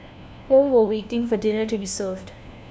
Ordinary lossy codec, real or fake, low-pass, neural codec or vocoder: none; fake; none; codec, 16 kHz, 1 kbps, FunCodec, trained on LibriTTS, 50 frames a second